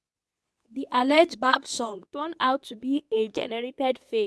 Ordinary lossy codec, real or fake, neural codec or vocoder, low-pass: none; fake; codec, 24 kHz, 0.9 kbps, WavTokenizer, medium speech release version 2; none